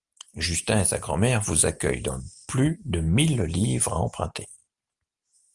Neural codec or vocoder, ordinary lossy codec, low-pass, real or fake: none; Opus, 32 kbps; 10.8 kHz; real